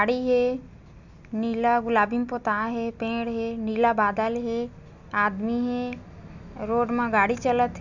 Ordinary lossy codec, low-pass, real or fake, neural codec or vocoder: none; 7.2 kHz; real; none